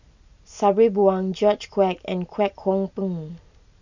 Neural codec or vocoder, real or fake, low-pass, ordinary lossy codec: none; real; 7.2 kHz; none